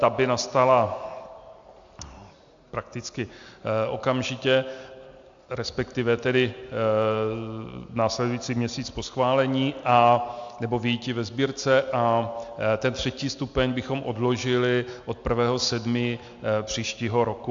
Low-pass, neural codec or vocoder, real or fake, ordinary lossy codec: 7.2 kHz; none; real; AAC, 64 kbps